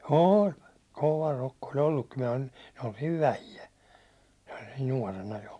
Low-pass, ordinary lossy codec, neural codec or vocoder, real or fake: none; none; none; real